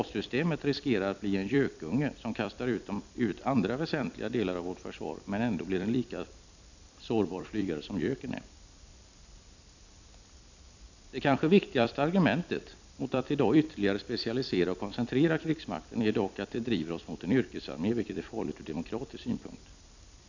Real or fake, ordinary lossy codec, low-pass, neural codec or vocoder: fake; none; 7.2 kHz; vocoder, 44.1 kHz, 128 mel bands every 256 samples, BigVGAN v2